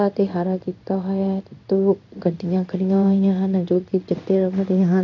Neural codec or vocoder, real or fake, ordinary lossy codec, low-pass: codec, 16 kHz in and 24 kHz out, 1 kbps, XY-Tokenizer; fake; none; 7.2 kHz